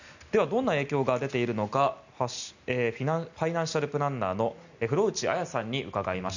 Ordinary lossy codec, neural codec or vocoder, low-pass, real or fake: none; none; 7.2 kHz; real